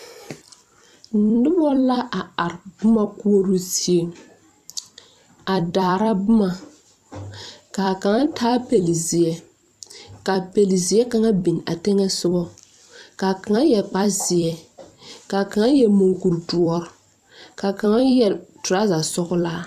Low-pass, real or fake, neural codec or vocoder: 14.4 kHz; fake; vocoder, 44.1 kHz, 128 mel bands every 256 samples, BigVGAN v2